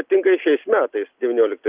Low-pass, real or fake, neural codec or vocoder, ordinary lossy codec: 3.6 kHz; real; none; Opus, 24 kbps